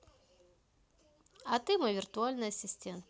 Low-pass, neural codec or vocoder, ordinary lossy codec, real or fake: none; none; none; real